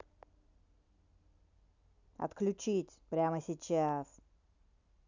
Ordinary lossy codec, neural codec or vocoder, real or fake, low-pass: none; none; real; 7.2 kHz